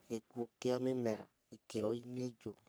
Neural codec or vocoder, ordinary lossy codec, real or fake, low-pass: codec, 44.1 kHz, 3.4 kbps, Pupu-Codec; none; fake; none